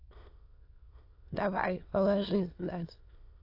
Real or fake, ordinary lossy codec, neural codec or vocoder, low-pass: fake; AAC, 32 kbps; autoencoder, 22.05 kHz, a latent of 192 numbers a frame, VITS, trained on many speakers; 5.4 kHz